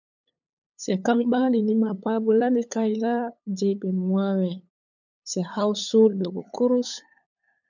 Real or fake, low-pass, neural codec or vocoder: fake; 7.2 kHz; codec, 16 kHz, 8 kbps, FunCodec, trained on LibriTTS, 25 frames a second